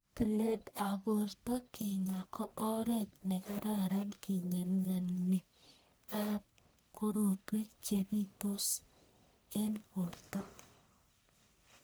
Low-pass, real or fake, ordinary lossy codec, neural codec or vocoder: none; fake; none; codec, 44.1 kHz, 1.7 kbps, Pupu-Codec